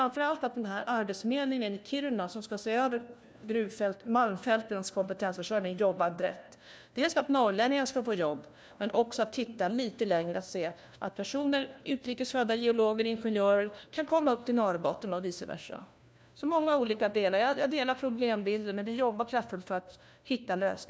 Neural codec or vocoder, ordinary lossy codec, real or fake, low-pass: codec, 16 kHz, 1 kbps, FunCodec, trained on LibriTTS, 50 frames a second; none; fake; none